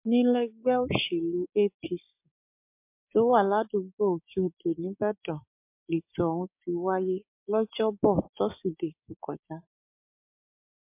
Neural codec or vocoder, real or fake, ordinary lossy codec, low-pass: codec, 16 kHz, 6 kbps, DAC; fake; MP3, 32 kbps; 3.6 kHz